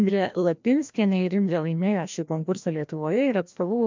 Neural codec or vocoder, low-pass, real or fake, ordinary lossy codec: codec, 16 kHz, 1 kbps, FreqCodec, larger model; 7.2 kHz; fake; MP3, 64 kbps